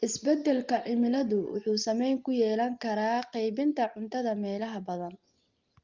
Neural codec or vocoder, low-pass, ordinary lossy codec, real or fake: none; 7.2 kHz; Opus, 32 kbps; real